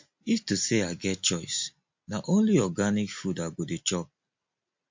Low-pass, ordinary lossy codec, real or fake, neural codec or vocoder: 7.2 kHz; MP3, 48 kbps; real; none